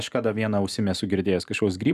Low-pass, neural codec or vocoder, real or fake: 14.4 kHz; none; real